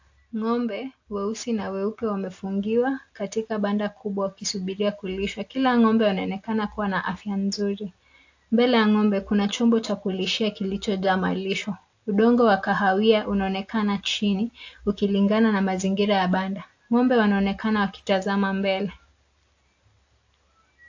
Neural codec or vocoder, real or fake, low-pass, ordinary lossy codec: none; real; 7.2 kHz; AAC, 48 kbps